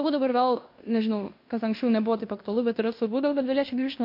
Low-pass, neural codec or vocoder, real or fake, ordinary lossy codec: 5.4 kHz; codec, 16 kHz in and 24 kHz out, 0.9 kbps, LongCat-Audio-Codec, fine tuned four codebook decoder; fake; MP3, 32 kbps